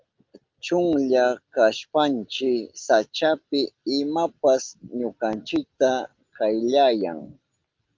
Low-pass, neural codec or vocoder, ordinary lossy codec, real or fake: 7.2 kHz; none; Opus, 24 kbps; real